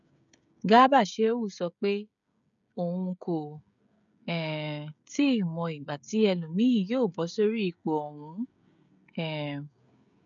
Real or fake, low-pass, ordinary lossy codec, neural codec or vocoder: fake; 7.2 kHz; none; codec, 16 kHz, 16 kbps, FreqCodec, smaller model